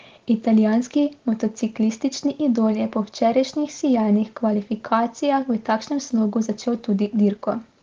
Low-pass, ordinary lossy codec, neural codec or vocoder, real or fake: 7.2 kHz; Opus, 16 kbps; none; real